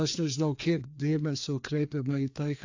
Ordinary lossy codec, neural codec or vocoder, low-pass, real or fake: AAC, 48 kbps; codec, 16 kHz, 2 kbps, FreqCodec, larger model; 7.2 kHz; fake